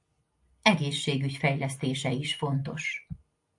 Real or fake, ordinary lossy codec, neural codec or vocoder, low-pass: real; AAC, 64 kbps; none; 10.8 kHz